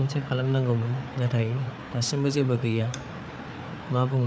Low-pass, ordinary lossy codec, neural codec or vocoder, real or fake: none; none; codec, 16 kHz, 4 kbps, FreqCodec, larger model; fake